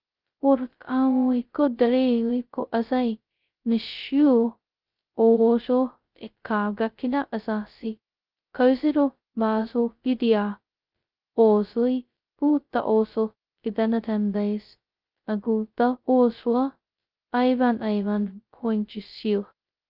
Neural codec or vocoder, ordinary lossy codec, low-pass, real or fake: codec, 16 kHz, 0.2 kbps, FocalCodec; Opus, 32 kbps; 5.4 kHz; fake